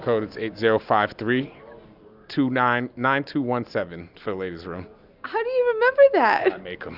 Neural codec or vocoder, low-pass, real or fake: none; 5.4 kHz; real